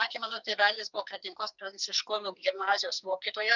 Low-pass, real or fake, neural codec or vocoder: 7.2 kHz; fake; codec, 44.1 kHz, 2.6 kbps, SNAC